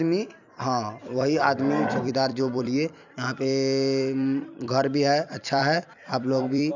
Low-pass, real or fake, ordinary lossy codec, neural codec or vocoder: 7.2 kHz; fake; none; vocoder, 44.1 kHz, 128 mel bands every 256 samples, BigVGAN v2